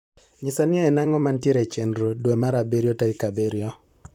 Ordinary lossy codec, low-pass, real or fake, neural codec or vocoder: none; 19.8 kHz; fake; vocoder, 44.1 kHz, 128 mel bands, Pupu-Vocoder